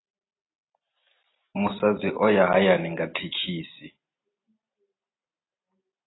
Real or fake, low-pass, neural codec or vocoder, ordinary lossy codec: fake; 7.2 kHz; vocoder, 44.1 kHz, 128 mel bands every 256 samples, BigVGAN v2; AAC, 16 kbps